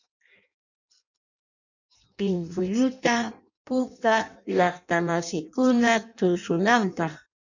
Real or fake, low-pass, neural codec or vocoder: fake; 7.2 kHz; codec, 16 kHz in and 24 kHz out, 0.6 kbps, FireRedTTS-2 codec